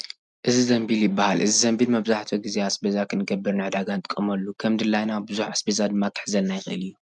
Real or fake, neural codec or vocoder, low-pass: real; none; 10.8 kHz